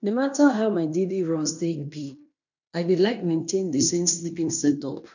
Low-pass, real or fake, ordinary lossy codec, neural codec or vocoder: 7.2 kHz; fake; none; codec, 16 kHz in and 24 kHz out, 0.9 kbps, LongCat-Audio-Codec, fine tuned four codebook decoder